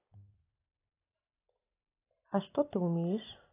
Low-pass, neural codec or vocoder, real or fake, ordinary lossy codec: 3.6 kHz; none; real; AAC, 16 kbps